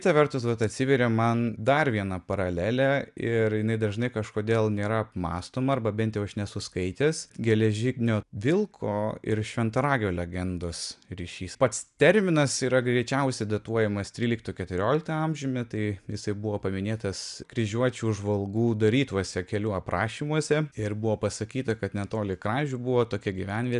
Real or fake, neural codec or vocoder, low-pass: real; none; 10.8 kHz